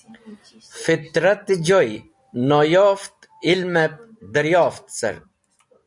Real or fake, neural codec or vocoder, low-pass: real; none; 10.8 kHz